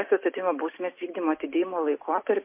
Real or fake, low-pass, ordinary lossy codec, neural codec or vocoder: real; 3.6 kHz; MP3, 24 kbps; none